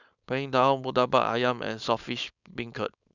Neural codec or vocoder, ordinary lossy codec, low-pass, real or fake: codec, 16 kHz, 4.8 kbps, FACodec; none; 7.2 kHz; fake